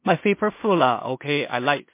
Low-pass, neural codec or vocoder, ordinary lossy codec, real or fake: 3.6 kHz; codec, 16 kHz in and 24 kHz out, 0.4 kbps, LongCat-Audio-Codec, two codebook decoder; MP3, 24 kbps; fake